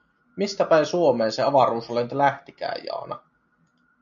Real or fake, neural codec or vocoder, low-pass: real; none; 7.2 kHz